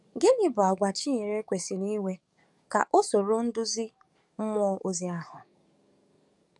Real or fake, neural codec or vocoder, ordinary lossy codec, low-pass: fake; codec, 44.1 kHz, 7.8 kbps, DAC; none; 10.8 kHz